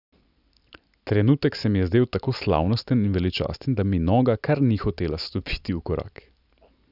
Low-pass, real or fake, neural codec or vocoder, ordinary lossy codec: 5.4 kHz; real; none; none